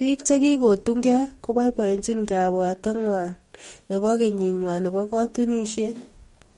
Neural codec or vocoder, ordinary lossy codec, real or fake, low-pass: codec, 44.1 kHz, 2.6 kbps, DAC; MP3, 48 kbps; fake; 19.8 kHz